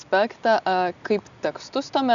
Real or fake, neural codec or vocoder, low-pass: real; none; 7.2 kHz